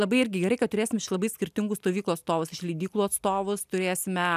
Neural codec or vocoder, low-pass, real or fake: none; 14.4 kHz; real